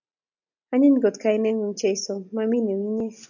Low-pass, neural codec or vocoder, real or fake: 7.2 kHz; none; real